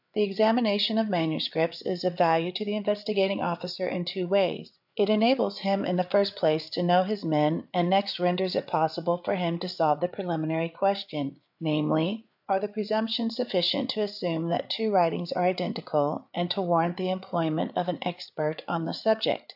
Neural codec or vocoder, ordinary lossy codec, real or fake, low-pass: codec, 16 kHz, 8 kbps, FreqCodec, larger model; AAC, 48 kbps; fake; 5.4 kHz